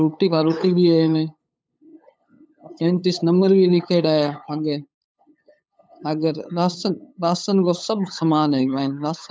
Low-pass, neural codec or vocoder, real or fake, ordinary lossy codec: none; codec, 16 kHz, 8 kbps, FunCodec, trained on LibriTTS, 25 frames a second; fake; none